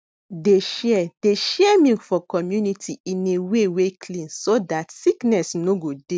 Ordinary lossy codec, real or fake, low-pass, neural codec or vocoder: none; real; none; none